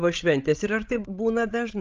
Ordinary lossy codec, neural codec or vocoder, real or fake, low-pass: Opus, 24 kbps; codec, 16 kHz, 16 kbps, FreqCodec, larger model; fake; 7.2 kHz